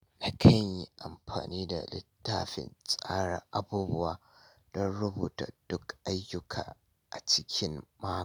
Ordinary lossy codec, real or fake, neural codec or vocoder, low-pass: none; real; none; none